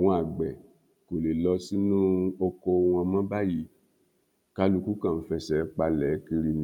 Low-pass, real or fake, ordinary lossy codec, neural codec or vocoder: 19.8 kHz; real; none; none